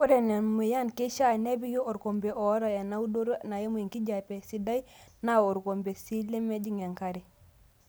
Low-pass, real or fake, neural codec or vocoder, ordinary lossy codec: none; real; none; none